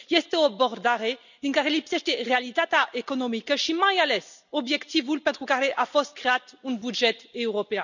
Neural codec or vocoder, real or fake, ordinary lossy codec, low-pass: none; real; none; 7.2 kHz